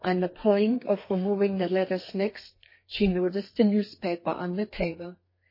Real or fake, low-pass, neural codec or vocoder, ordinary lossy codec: fake; 5.4 kHz; codec, 32 kHz, 1.9 kbps, SNAC; MP3, 24 kbps